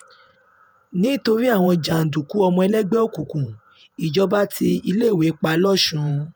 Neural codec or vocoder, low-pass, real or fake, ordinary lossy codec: vocoder, 48 kHz, 128 mel bands, Vocos; none; fake; none